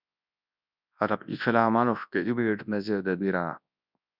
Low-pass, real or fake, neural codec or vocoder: 5.4 kHz; fake; codec, 24 kHz, 0.9 kbps, WavTokenizer, large speech release